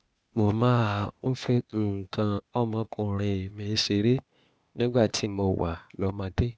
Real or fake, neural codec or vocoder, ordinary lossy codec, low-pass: fake; codec, 16 kHz, 0.8 kbps, ZipCodec; none; none